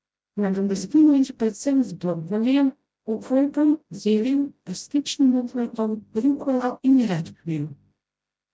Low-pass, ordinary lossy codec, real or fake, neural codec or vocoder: none; none; fake; codec, 16 kHz, 0.5 kbps, FreqCodec, smaller model